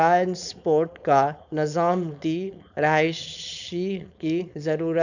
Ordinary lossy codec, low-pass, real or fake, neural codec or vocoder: none; 7.2 kHz; fake; codec, 16 kHz, 4.8 kbps, FACodec